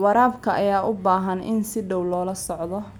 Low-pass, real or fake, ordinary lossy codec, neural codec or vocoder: none; real; none; none